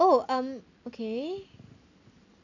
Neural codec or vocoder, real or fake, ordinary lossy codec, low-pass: none; real; none; 7.2 kHz